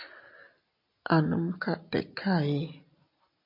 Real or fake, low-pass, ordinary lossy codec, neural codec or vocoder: real; 5.4 kHz; MP3, 48 kbps; none